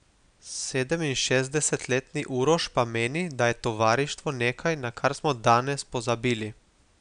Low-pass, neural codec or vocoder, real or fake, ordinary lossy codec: 9.9 kHz; none; real; none